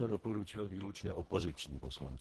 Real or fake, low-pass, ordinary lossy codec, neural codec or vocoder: fake; 10.8 kHz; Opus, 16 kbps; codec, 24 kHz, 1.5 kbps, HILCodec